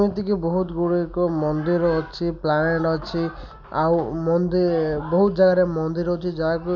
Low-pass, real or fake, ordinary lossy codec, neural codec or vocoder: 7.2 kHz; real; none; none